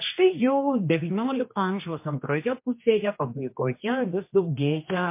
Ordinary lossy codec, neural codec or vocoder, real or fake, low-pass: MP3, 24 kbps; codec, 16 kHz, 2 kbps, X-Codec, HuBERT features, trained on general audio; fake; 3.6 kHz